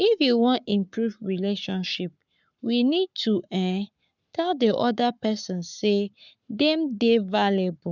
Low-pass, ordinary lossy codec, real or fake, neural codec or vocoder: 7.2 kHz; none; fake; codec, 44.1 kHz, 7.8 kbps, Pupu-Codec